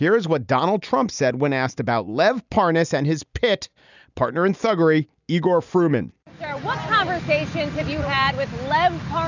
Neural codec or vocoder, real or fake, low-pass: none; real; 7.2 kHz